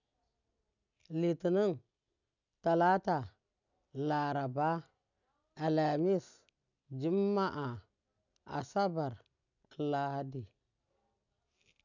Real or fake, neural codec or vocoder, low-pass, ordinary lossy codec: real; none; 7.2 kHz; none